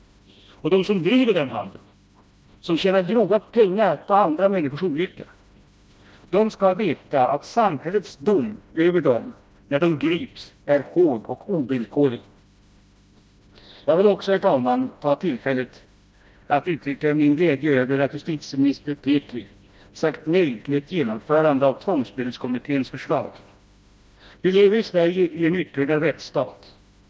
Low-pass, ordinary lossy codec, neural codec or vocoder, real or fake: none; none; codec, 16 kHz, 1 kbps, FreqCodec, smaller model; fake